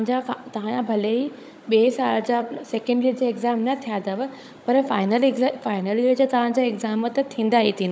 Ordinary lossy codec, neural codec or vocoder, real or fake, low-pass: none; codec, 16 kHz, 16 kbps, FunCodec, trained on Chinese and English, 50 frames a second; fake; none